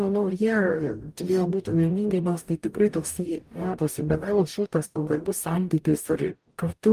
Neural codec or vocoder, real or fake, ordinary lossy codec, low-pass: codec, 44.1 kHz, 0.9 kbps, DAC; fake; Opus, 32 kbps; 14.4 kHz